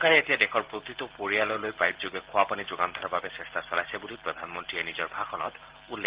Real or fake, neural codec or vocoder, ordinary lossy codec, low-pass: real; none; Opus, 16 kbps; 3.6 kHz